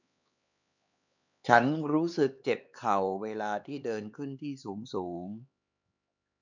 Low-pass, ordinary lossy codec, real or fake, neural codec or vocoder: 7.2 kHz; none; fake; codec, 16 kHz, 4 kbps, X-Codec, HuBERT features, trained on LibriSpeech